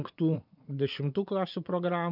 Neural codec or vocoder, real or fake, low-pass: vocoder, 24 kHz, 100 mel bands, Vocos; fake; 5.4 kHz